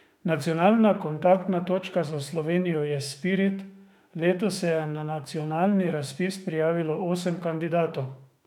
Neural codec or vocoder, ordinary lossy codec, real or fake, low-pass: autoencoder, 48 kHz, 32 numbers a frame, DAC-VAE, trained on Japanese speech; none; fake; 19.8 kHz